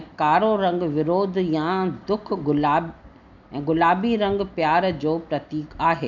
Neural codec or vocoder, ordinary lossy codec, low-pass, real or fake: none; none; 7.2 kHz; real